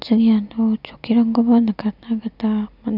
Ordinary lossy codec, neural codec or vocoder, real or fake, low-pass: none; none; real; 5.4 kHz